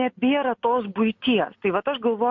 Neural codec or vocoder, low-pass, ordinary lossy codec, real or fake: none; 7.2 kHz; MP3, 48 kbps; real